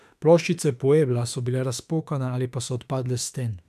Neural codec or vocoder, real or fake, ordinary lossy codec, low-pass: autoencoder, 48 kHz, 32 numbers a frame, DAC-VAE, trained on Japanese speech; fake; none; 14.4 kHz